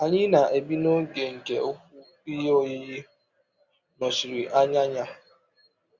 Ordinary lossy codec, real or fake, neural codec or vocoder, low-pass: Opus, 64 kbps; real; none; 7.2 kHz